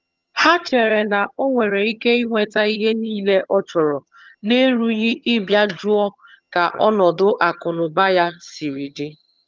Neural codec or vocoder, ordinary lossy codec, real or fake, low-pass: vocoder, 22.05 kHz, 80 mel bands, HiFi-GAN; Opus, 32 kbps; fake; 7.2 kHz